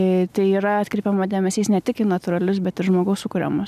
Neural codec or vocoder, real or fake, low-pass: none; real; 14.4 kHz